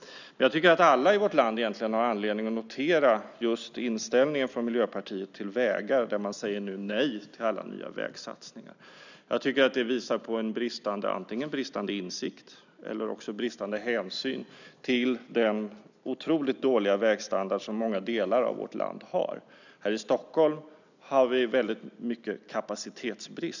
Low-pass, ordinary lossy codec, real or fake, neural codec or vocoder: 7.2 kHz; none; real; none